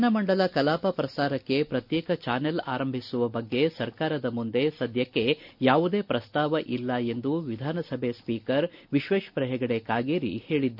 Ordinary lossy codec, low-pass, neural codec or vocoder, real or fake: none; 5.4 kHz; none; real